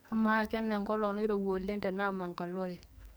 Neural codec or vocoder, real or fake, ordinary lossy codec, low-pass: codec, 44.1 kHz, 2.6 kbps, SNAC; fake; none; none